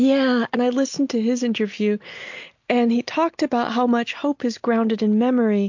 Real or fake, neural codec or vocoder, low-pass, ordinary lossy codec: real; none; 7.2 kHz; MP3, 48 kbps